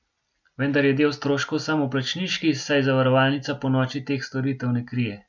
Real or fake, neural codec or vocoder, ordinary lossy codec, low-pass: real; none; none; 7.2 kHz